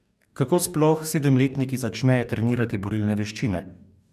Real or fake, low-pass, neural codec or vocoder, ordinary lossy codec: fake; 14.4 kHz; codec, 44.1 kHz, 2.6 kbps, DAC; none